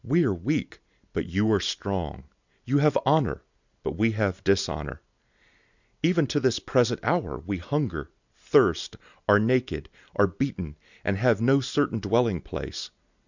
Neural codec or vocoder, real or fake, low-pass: none; real; 7.2 kHz